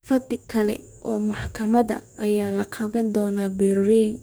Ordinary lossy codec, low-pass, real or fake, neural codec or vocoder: none; none; fake; codec, 44.1 kHz, 2.6 kbps, DAC